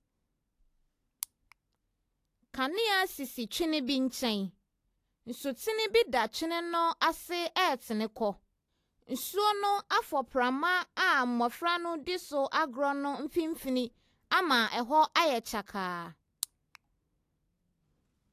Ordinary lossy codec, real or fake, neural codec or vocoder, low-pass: AAC, 64 kbps; real; none; 14.4 kHz